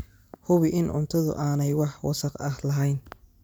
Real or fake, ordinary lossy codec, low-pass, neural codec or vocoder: fake; none; none; vocoder, 44.1 kHz, 128 mel bands every 512 samples, BigVGAN v2